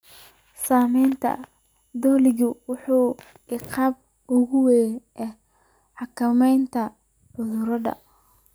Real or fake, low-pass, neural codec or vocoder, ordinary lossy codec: real; none; none; none